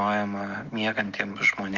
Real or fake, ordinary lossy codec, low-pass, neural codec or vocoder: real; Opus, 32 kbps; 7.2 kHz; none